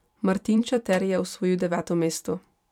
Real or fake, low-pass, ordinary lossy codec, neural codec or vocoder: fake; 19.8 kHz; none; vocoder, 44.1 kHz, 128 mel bands every 256 samples, BigVGAN v2